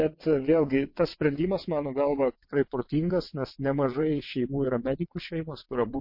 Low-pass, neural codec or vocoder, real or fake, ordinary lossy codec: 5.4 kHz; vocoder, 22.05 kHz, 80 mel bands, WaveNeXt; fake; MP3, 32 kbps